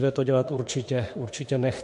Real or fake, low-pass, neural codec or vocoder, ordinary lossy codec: fake; 14.4 kHz; autoencoder, 48 kHz, 32 numbers a frame, DAC-VAE, trained on Japanese speech; MP3, 48 kbps